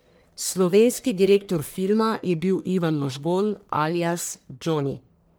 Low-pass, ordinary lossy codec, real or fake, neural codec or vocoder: none; none; fake; codec, 44.1 kHz, 1.7 kbps, Pupu-Codec